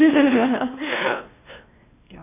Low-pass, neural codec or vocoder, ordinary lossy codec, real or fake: 3.6 kHz; codec, 16 kHz, 1 kbps, X-Codec, WavLM features, trained on Multilingual LibriSpeech; none; fake